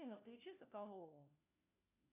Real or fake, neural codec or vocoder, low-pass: fake; codec, 16 kHz, 1 kbps, FunCodec, trained on LibriTTS, 50 frames a second; 3.6 kHz